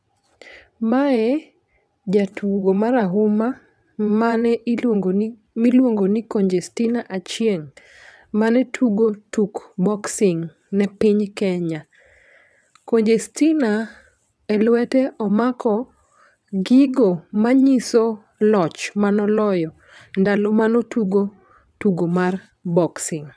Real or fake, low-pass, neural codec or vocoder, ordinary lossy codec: fake; none; vocoder, 22.05 kHz, 80 mel bands, WaveNeXt; none